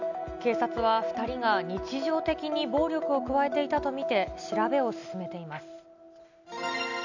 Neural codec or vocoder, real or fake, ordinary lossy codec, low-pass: none; real; none; 7.2 kHz